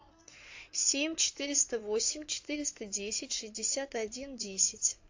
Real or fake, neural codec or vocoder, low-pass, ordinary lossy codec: fake; codec, 16 kHz in and 24 kHz out, 2.2 kbps, FireRedTTS-2 codec; 7.2 kHz; AAC, 48 kbps